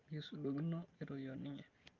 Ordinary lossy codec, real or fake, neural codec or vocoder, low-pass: Opus, 24 kbps; real; none; 7.2 kHz